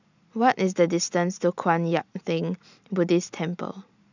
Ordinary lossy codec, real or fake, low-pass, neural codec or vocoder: none; real; 7.2 kHz; none